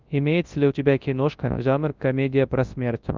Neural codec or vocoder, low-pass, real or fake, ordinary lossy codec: codec, 24 kHz, 0.9 kbps, WavTokenizer, large speech release; 7.2 kHz; fake; Opus, 32 kbps